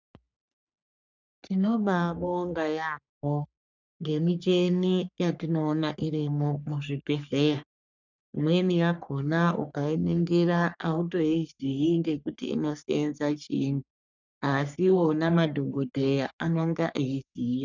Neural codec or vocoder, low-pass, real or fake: codec, 44.1 kHz, 3.4 kbps, Pupu-Codec; 7.2 kHz; fake